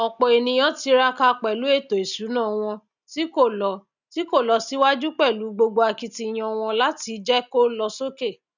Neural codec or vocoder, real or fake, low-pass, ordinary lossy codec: none; real; 7.2 kHz; none